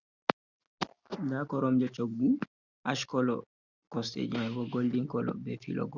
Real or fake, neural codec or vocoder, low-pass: real; none; 7.2 kHz